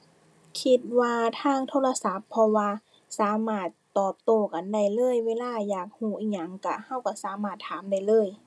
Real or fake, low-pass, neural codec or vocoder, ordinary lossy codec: real; none; none; none